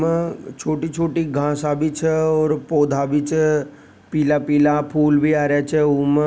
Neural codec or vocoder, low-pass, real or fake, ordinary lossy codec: none; none; real; none